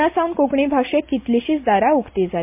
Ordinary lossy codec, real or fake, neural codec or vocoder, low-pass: MP3, 32 kbps; real; none; 3.6 kHz